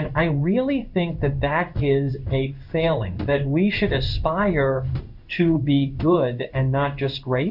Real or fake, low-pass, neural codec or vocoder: fake; 5.4 kHz; codec, 16 kHz in and 24 kHz out, 1 kbps, XY-Tokenizer